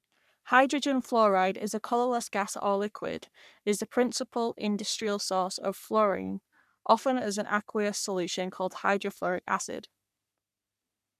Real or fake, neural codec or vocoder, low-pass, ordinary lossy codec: fake; codec, 44.1 kHz, 3.4 kbps, Pupu-Codec; 14.4 kHz; none